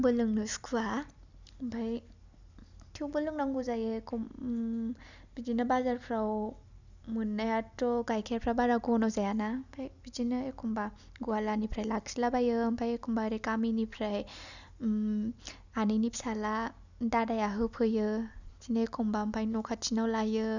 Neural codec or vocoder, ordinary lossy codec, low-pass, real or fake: none; none; 7.2 kHz; real